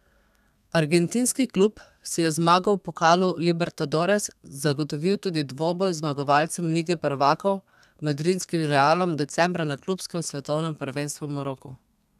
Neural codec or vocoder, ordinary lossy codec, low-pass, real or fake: codec, 32 kHz, 1.9 kbps, SNAC; none; 14.4 kHz; fake